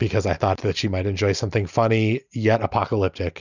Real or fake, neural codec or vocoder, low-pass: real; none; 7.2 kHz